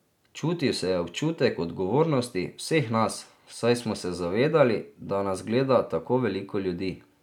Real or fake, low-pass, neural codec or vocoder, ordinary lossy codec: real; 19.8 kHz; none; none